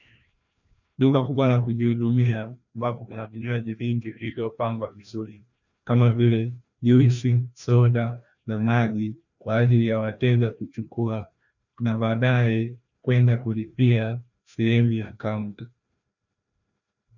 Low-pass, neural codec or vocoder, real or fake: 7.2 kHz; codec, 16 kHz, 1 kbps, FreqCodec, larger model; fake